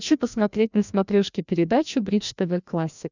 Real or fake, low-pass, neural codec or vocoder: fake; 7.2 kHz; codec, 16 kHz, 1 kbps, FreqCodec, larger model